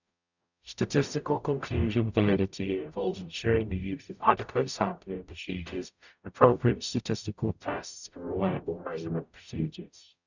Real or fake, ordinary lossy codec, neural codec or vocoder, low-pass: fake; none; codec, 44.1 kHz, 0.9 kbps, DAC; 7.2 kHz